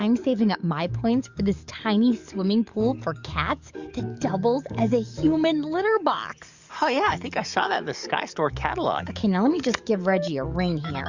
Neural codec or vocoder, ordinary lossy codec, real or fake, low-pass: codec, 44.1 kHz, 7.8 kbps, DAC; Opus, 64 kbps; fake; 7.2 kHz